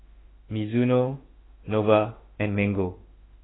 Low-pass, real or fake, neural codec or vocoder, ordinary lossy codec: 7.2 kHz; fake; autoencoder, 48 kHz, 32 numbers a frame, DAC-VAE, trained on Japanese speech; AAC, 16 kbps